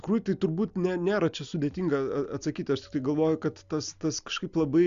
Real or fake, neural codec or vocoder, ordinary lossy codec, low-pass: real; none; Opus, 64 kbps; 7.2 kHz